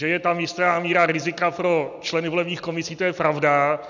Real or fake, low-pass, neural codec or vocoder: real; 7.2 kHz; none